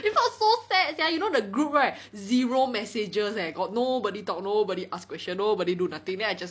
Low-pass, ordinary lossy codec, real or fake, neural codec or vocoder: none; none; real; none